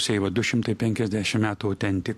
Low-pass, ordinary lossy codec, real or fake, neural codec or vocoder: 14.4 kHz; MP3, 64 kbps; real; none